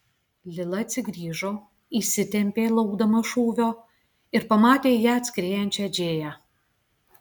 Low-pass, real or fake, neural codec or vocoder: 19.8 kHz; real; none